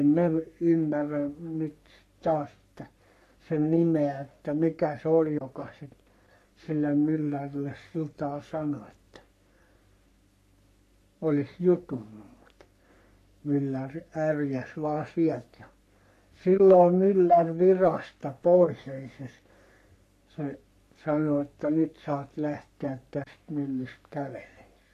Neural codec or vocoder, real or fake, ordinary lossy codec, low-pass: codec, 44.1 kHz, 3.4 kbps, Pupu-Codec; fake; none; 14.4 kHz